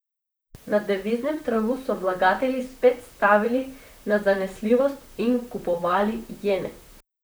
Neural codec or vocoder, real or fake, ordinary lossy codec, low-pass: vocoder, 44.1 kHz, 128 mel bands, Pupu-Vocoder; fake; none; none